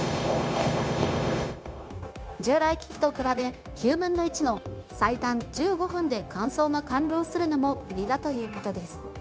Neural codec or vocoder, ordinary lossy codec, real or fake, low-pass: codec, 16 kHz, 0.9 kbps, LongCat-Audio-Codec; none; fake; none